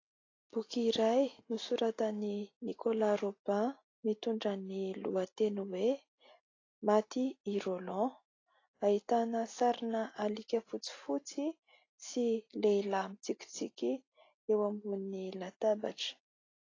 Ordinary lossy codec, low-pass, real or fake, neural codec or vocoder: AAC, 32 kbps; 7.2 kHz; real; none